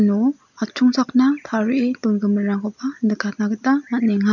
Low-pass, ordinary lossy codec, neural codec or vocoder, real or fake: 7.2 kHz; none; none; real